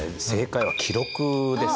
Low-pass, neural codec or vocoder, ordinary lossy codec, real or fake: none; none; none; real